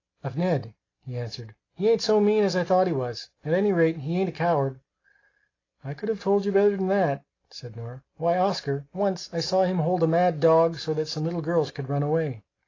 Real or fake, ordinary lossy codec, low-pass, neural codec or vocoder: real; AAC, 32 kbps; 7.2 kHz; none